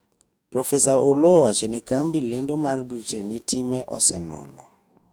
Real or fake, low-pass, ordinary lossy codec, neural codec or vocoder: fake; none; none; codec, 44.1 kHz, 2.6 kbps, DAC